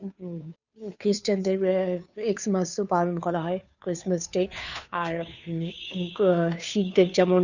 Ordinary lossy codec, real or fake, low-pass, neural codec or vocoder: none; fake; 7.2 kHz; codec, 16 kHz, 2 kbps, FunCodec, trained on Chinese and English, 25 frames a second